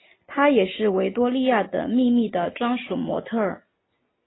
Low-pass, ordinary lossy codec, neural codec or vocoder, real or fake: 7.2 kHz; AAC, 16 kbps; none; real